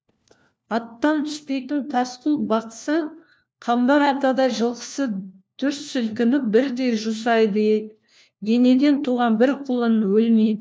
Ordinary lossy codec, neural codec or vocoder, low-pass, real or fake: none; codec, 16 kHz, 1 kbps, FunCodec, trained on LibriTTS, 50 frames a second; none; fake